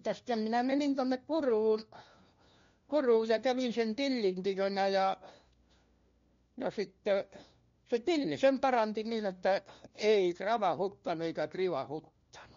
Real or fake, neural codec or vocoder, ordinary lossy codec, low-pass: fake; codec, 16 kHz, 1 kbps, FunCodec, trained on LibriTTS, 50 frames a second; MP3, 48 kbps; 7.2 kHz